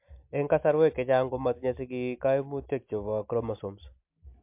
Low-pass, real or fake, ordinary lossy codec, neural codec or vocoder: 3.6 kHz; real; MP3, 32 kbps; none